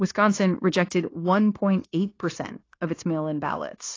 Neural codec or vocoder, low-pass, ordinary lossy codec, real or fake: codec, 16 kHz, 0.9 kbps, LongCat-Audio-Codec; 7.2 kHz; AAC, 32 kbps; fake